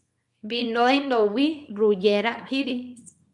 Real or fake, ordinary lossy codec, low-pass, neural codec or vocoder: fake; MP3, 96 kbps; 10.8 kHz; codec, 24 kHz, 0.9 kbps, WavTokenizer, small release